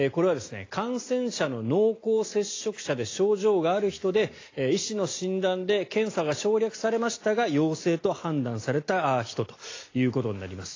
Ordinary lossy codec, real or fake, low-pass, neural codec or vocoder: AAC, 32 kbps; real; 7.2 kHz; none